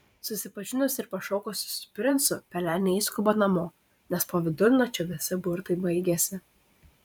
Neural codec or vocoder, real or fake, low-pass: vocoder, 44.1 kHz, 128 mel bands every 512 samples, BigVGAN v2; fake; 19.8 kHz